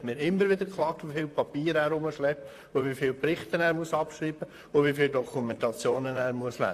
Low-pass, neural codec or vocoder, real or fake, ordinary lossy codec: 14.4 kHz; vocoder, 44.1 kHz, 128 mel bands, Pupu-Vocoder; fake; AAC, 64 kbps